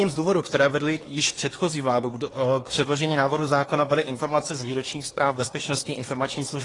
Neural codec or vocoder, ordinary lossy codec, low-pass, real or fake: codec, 24 kHz, 1 kbps, SNAC; AAC, 32 kbps; 10.8 kHz; fake